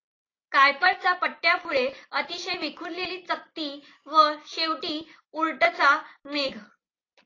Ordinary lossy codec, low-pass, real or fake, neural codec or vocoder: AAC, 32 kbps; 7.2 kHz; real; none